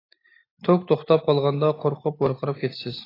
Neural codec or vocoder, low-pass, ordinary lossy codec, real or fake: none; 5.4 kHz; AAC, 24 kbps; real